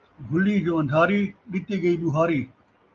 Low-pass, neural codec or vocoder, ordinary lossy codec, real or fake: 7.2 kHz; none; Opus, 32 kbps; real